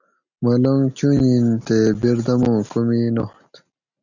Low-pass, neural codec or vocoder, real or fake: 7.2 kHz; none; real